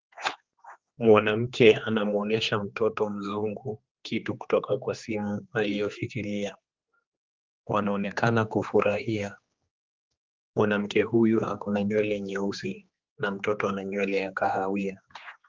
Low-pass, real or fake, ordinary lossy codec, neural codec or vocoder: 7.2 kHz; fake; Opus, 32 kbps; codec, 16 kHz, 2 kbps, X-Codec, HuBERT features, trained on general audio